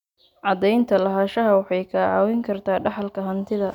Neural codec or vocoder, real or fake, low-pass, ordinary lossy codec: none; real; 19.8 kHz; none